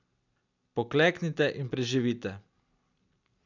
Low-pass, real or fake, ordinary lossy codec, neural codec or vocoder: 7.2 kHz; real; none; none